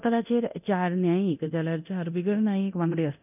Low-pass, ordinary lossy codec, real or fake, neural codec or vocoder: 3.6 kHz; none; fake; codec, 24 kHz, 0.9 kbps, DualCodec